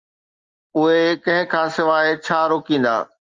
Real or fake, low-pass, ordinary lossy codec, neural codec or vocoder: real; 7.2 kHz; Opus, 24 kbps; none